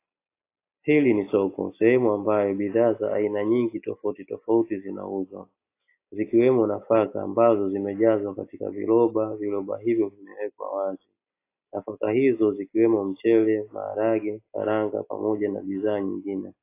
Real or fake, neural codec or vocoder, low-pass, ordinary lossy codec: real; none; 3.6 kHz; AAC, 24 kbps